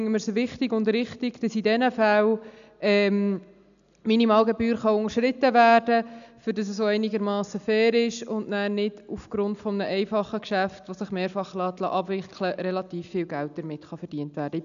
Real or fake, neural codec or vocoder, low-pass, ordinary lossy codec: real; none; 7.2 kHz; none